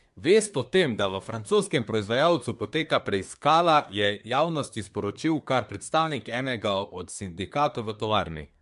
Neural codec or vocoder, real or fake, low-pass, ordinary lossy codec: codec, 24 kHz, 1 kbps, SNAC; fake; 10.8 kHz; MP3, 64 kbps